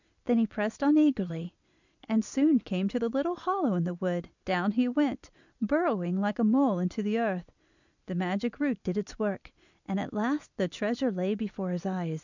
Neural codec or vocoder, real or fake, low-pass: vocoder, 44.1 kHz, 128 mel bands every 256 samples, BigVGAN v2; fake; 7.2 kHz